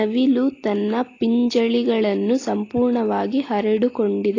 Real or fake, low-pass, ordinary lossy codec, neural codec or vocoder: real; 7.2 kHz; AAC, 32 kbps; none